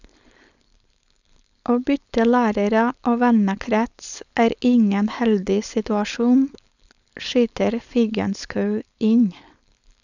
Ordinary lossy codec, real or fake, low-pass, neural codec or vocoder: none; fake; 7.2 kHz; codec, 16 kHz, 4.8 kbps, FACodec